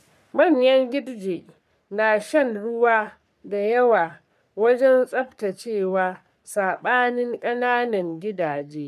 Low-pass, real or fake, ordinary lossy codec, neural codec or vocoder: 14.4 kHz; fake; none; codec, 44.1 kHz, 3.4 kbps, Pupu-Codec